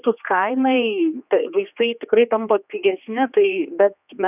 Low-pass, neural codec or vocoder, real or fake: 3.6 kHz; codec, 16 kHz, 2 kbps, X-Codec, HuBERT features, trained on general audio; fake